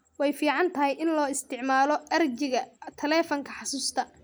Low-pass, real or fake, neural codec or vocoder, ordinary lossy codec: none; real; none; none